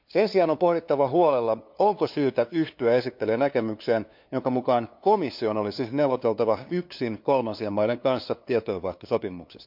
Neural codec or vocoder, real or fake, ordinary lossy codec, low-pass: codec, 16 kHz, 2 kbps, FunCodec, trained on LibriTTS, 25 frames a second; fake; MP3, 48 kbps; 5.4 kHz